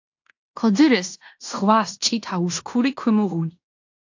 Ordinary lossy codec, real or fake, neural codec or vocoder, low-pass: AAC, 48 kbps; fake; codec, 16 kHz in and 24 kHz out, 0.9 kbps, LongCat-Audio-Codec, fine tuned four codebook decoder; 7.2 kHz